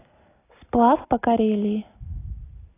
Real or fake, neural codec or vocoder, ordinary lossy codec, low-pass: real; none; AAC, 16 kbps; 3.6 kHz